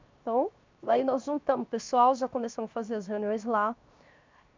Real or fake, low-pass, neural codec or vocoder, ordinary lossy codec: fake; 7.2 kHz; codec, 16 kHz, 0.7 kbps, FocalCodec; MP3, 64 kbps